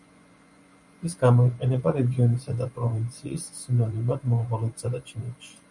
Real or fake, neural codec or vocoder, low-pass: fake; vocoder, 24 kHz, 100 mel bands, Vocos; 10.8 kHz